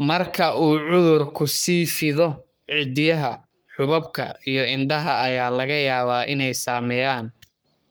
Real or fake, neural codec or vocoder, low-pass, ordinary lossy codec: fake; codec, 44.1 kHz, 3.4 kbps, Pupu-Codec; none; none